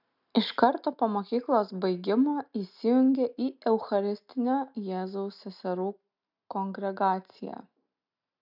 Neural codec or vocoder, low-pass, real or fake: none; 5.4 kHz; real